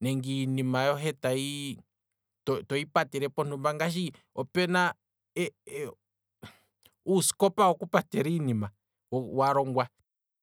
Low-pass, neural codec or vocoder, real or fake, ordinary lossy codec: none; none; real; none